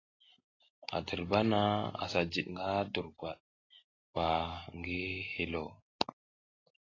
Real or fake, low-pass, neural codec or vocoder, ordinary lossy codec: real; 7.2 kHz; none; AAC, 32 kbps